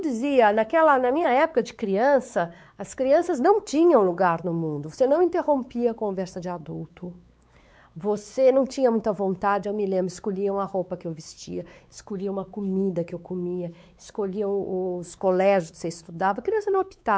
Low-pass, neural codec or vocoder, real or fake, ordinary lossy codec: none; codec, 16 kHz, 4 kbps, X-Codec, WavLM features, trained on Multilingual LibriSpeech; fake; none